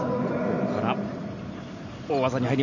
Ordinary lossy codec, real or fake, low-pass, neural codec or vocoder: none; real; 7.2 kHz; none